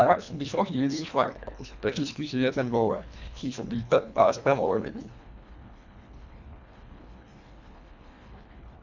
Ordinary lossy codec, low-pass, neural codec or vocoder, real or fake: none; 7.2 kHz; codec, 24 kHz, 1.5 kbps, HILCodec; fake